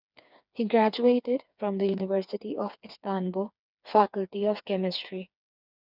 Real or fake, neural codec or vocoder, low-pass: fake; codec, 16 kHz, 4 kbps, FreqCodec, smaller model; 5.4 kHz